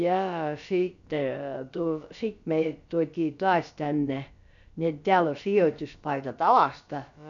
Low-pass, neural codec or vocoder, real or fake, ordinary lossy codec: 7.2 kHz; codec, 16 kHz, about 1 kbps, DyCAST, with the encoder's durations; fake; none